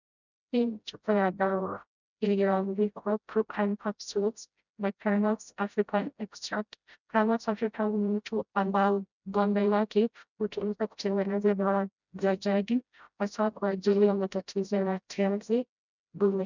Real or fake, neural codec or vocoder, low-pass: fake; codec, 16 kHz, 0.5 kbps, FreqCodec, smaller model; 7.2 kHz